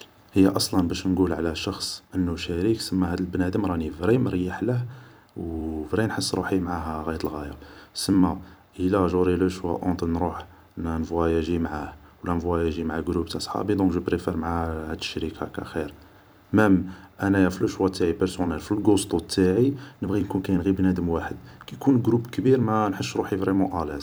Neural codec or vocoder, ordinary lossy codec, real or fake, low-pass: vocoder, 44.1 kHz, 128 mel bands every 256 samples, BigVGAN v2; none; fake; none